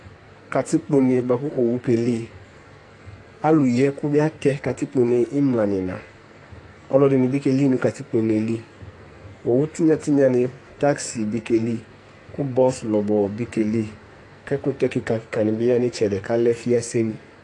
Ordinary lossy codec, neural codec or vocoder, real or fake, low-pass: AAC, 48 kbps; codec, 44.1 kHz, 2.6 kbps, SNAC; fake; 10.8 kHz